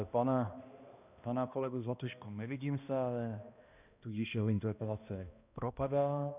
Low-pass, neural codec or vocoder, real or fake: 3.6 kHz; codec, 16 kHz, 1 kbps, X-Codec, HuBERT features, trained on balanced general audio; fake